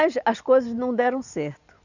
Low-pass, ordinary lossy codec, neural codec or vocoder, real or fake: 7.2 kHz; none; none; real